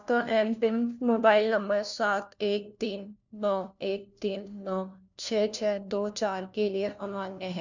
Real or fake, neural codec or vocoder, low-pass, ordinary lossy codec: fake; codec, 16 kHz, 1 kbps, FunCodec, trained on LibriTTS, 50 frames a second; 7.2 kHz; none